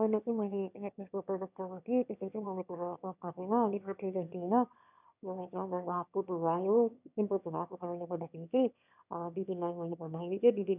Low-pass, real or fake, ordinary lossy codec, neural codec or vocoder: 3.6 kHz; fake; none; autoencoder, 22.05 kHz, a latent of 192 numbers a frame, VITS, trained on one speaker